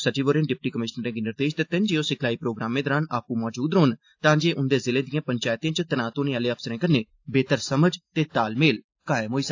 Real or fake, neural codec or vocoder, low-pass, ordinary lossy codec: real; none; 7.2 kHz; AAC, 48 kbps